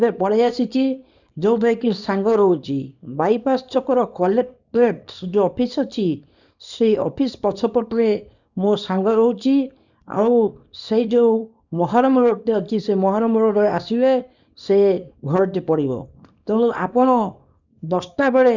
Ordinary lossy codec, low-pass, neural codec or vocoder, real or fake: none; 7.2 kHz; codec, 24 kHz, 0.9 kbps, WavTokenizer, small release; fake